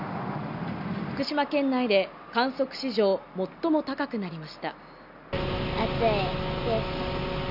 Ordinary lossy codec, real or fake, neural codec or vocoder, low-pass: none; real; none; 5.4 kHz